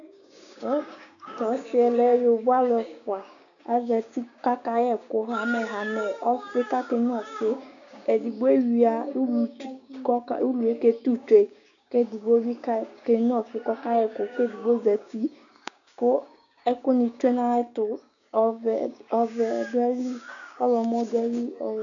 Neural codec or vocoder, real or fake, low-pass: codec, 16 kHz, 6 kbps, DAC; fake; 7.2 kHz